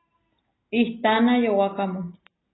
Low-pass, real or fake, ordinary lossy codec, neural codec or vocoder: 7.2 kHz; real; AAC, 16 kbps; none